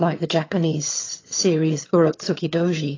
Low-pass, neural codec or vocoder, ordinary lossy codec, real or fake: 7.2 kHz; vocoder, 22.05 kHz, 80 mel bands, HiFi-GAN; AAC, 32 kbps; fake